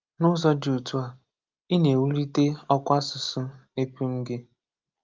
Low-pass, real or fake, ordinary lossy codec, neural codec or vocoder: 7.2 kHz; real; Opus, 24 kbps; none